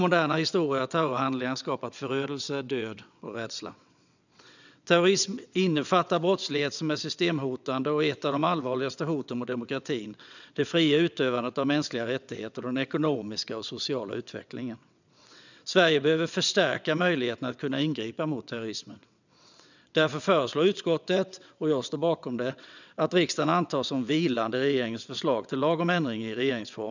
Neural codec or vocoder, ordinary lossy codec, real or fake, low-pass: vocoder, 22.05 kHz, 80 mel bands, WaveNeXt; none; fake; 7.2 kHz